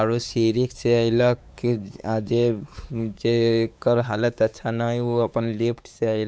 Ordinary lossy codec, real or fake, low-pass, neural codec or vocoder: none; fake; none; codec, 16 kHz, 4 kbps, X-Codec, WavLM features, trained on Multilingual LibriSpeech